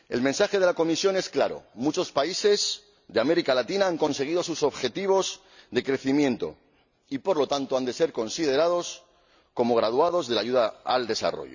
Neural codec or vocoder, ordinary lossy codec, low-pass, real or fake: none; none; 7.2 kHz; real